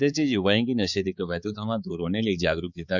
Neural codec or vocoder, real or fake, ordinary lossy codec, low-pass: codec, 16 kHz, 4 kbps, X-Codec, HuBERT features, trained on balanced general audio; fake; Opus, 64 kbps; 7.2 kHz